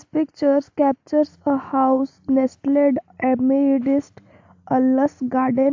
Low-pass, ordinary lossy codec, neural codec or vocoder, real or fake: 7.2 kHz; AAC, 48 kbps; none; real